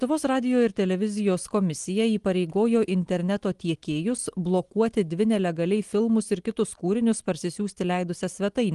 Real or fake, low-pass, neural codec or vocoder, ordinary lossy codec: real; 10.8 kHz; none; Opus, 24 kbps